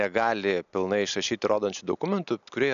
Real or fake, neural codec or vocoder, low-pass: real; none; 7.2 kHz